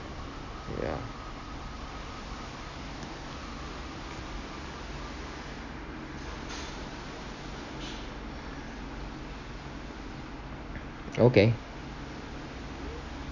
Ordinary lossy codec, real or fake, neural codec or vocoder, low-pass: none; real; none; 7.2 kHz